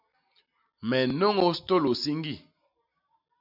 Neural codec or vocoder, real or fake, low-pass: none; real; 5.4 kHz